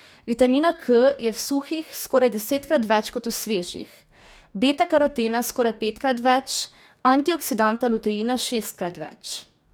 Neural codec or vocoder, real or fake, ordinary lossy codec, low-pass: codec, 44.1 kHz, 2.6 kbps, DAC; fake; none; none